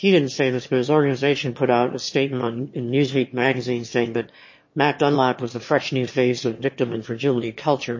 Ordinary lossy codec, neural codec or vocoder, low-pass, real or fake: MP3, 32 kbps; autoencoder, 22.05 kHz, a latent of 192 numbers a frame, VITS, trained on one speaker; 7.2 kHz; fake